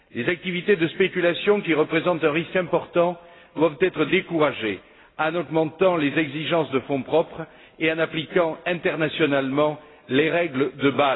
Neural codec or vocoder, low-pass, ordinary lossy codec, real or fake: none; 7.2 kHz; AAC, 16 kbps; real